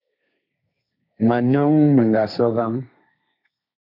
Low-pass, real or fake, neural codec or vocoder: 5.4 kHz; fake; codec, 16 kHz, 1.1 kbps, Voila-Tokenizer